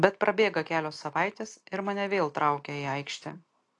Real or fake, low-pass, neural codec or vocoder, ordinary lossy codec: real; 10.8 kHz; none; AAC, 48 kbps